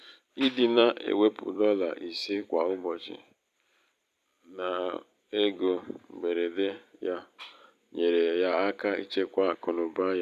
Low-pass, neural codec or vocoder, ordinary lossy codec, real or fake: 14.4 kHz; vocoder, 44.1 kHz, 128 mel bands every 256 samples, BigVGAN v2; none; fake